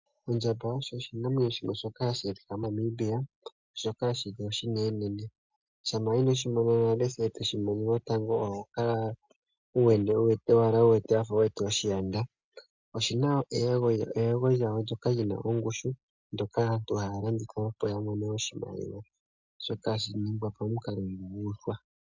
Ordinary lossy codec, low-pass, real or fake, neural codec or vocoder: MP3, 64 kbps; 7.2 kHz; real; none